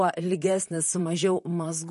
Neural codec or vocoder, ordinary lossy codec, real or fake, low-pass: vocoder, 44.1 kHz, 128 mel bands, Pupu-Vocoder; MP3, 48 kbps; fake; 14.4 kHz